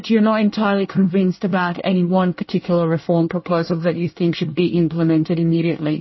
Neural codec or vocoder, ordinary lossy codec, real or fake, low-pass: codec, 24 kHz, 1 kbps, SNAC; MP3, 24 kbps; fake; 7.2 kHz